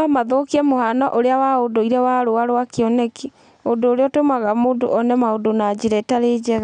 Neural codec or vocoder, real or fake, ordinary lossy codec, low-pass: none; real; none; 9.9 kHz